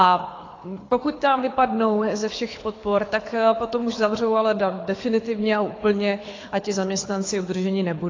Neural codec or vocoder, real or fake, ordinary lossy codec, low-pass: codec, 24 kHz, 6 kbps, HILCodec; fake; AAC, 32 kbps; 7.2 kHz